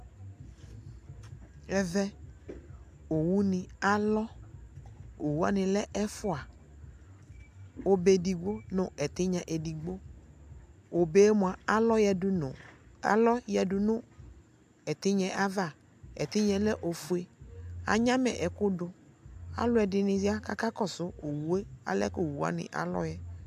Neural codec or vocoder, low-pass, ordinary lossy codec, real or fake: none; 14.4 kHz; AAC, 96 kbps; real